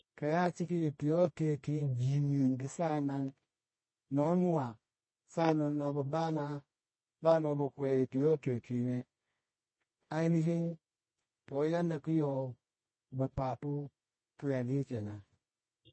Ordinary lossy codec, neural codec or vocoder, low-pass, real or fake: MP3, 32 kbps; codec, 24 kHz, 0.9 kbps, WavTokenizer, medium music audio release; 9.9 kHz; fake